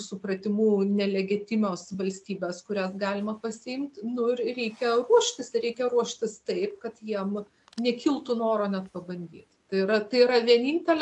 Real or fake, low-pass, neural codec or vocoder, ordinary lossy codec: real; 10.8 kHz; none; AAC, 64 kbps